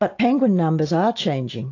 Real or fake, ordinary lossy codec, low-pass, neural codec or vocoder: real; AAC, 48 kbps; 7.2 kHz; none